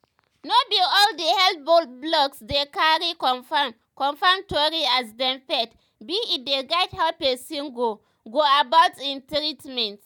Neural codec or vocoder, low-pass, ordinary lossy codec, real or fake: none; 19.8 kHz; none; real